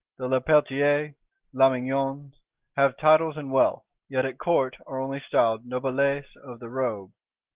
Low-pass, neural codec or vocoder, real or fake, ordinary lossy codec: 3.6 kHz; none; real; Opus, 16 kbps